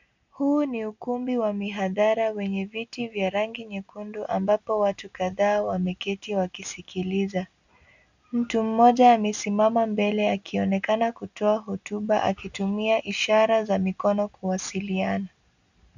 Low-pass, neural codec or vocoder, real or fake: 7.2 kHz; none; real